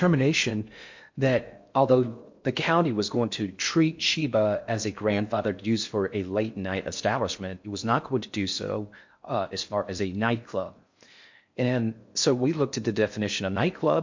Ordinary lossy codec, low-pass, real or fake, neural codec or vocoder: MP3, 48 kbps; 7.2 kHz; fake; codec, 16 kHz in and 24 kHz out, 0.6 kbps, FocalCodec, streaming, 4096 codes